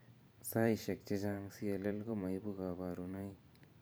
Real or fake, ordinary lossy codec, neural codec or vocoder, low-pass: fake; none; vocoder, 44.1 kHz, 128 mel bands every 512 samples, BigVGAN v2; none